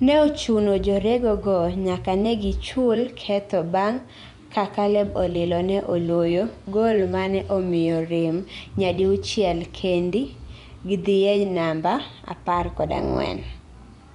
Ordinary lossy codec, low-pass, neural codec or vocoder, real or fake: none; 10.8 kHz; vocoder, 24 kHz, 100 mel bands, Vocos; fake